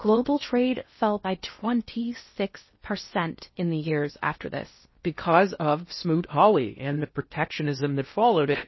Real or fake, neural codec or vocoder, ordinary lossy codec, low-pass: fake; codec, 16 kHz in and 24 kHz out, 0.6 kbps, FocalCodec, streaming, 4096 codes; MP3, 24 kbps; 7.2 kHz